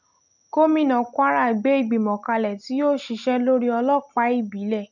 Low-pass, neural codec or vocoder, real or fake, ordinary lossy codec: 7.2 kHz; none; real; none